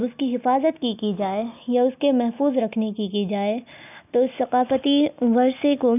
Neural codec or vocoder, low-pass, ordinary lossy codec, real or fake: none; 3.6 kHz; none; real